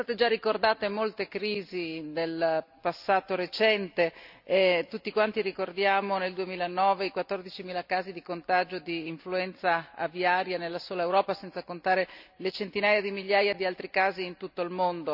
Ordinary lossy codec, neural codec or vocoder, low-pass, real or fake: none; none; 5.4 kHz; real